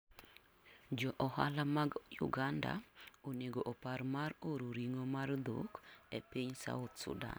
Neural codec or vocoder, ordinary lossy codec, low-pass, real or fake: none; none; none; real